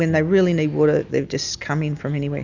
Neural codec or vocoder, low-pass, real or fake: none; 7.2 kHz; real